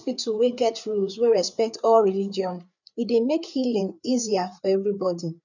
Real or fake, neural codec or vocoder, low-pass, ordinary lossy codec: fake; codec, 16 kHz, 4 kbps, FreqCodec, larger model; 7.2 kHz; none